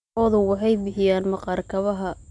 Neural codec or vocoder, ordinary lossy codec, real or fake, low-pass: none; none; real; 10.8 kHz